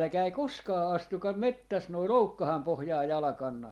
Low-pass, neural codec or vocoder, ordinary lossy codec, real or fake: 19.8 kHz; none; Opus, 24 kbps; real